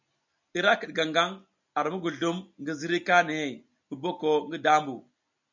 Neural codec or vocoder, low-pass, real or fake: none; 7.2 kHz; real